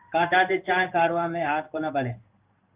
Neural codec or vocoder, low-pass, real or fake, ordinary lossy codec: codec, 16 kHz in and 24 kHz out, 1 kbps, XY-Tokenizer; 3.6 kHz; fake; Opus, 32 kbps